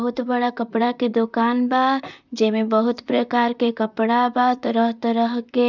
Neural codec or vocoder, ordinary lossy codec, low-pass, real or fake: vocoder, 44.1 kHz, 128 mel bands, Pupu-Vocoder; none; 7.2 kHz; fake